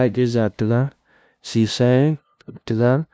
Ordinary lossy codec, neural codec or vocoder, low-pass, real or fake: none; codec, 16 kHz, 0.5 kbps, FunCodec, trained on LibriTTS, 25 frames a second; none; fake